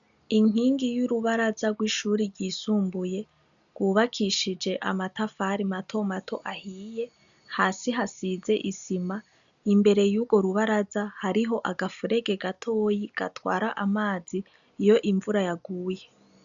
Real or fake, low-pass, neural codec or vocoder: real; 7.2 kHz; none